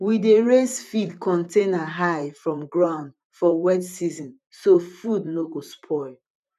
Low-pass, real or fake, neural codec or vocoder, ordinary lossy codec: 14.4 kHz; fake; vocoder, 44.1 kHz, 128 mel bands, Pupu-Vocoder; none